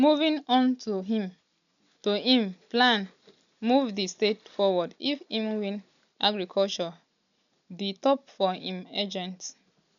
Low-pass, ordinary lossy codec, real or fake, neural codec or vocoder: 7.2 kHz; none; real; none